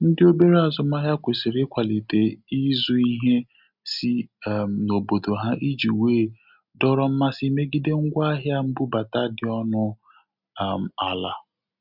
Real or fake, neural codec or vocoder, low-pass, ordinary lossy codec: real; none; 5.4 kHz; none